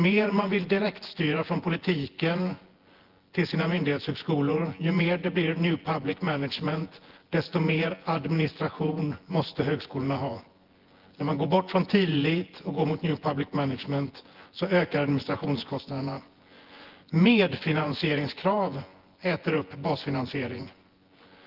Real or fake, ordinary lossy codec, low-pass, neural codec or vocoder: fake; Opus, 16 kbps; 5.4 kHz; vocoder, 24 kHz, 100 mel bands, Vocos